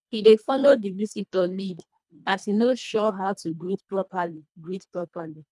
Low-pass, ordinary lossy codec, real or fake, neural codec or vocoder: none; none; fake; codec, 24 kHz, 1.5 kbps, HILCodec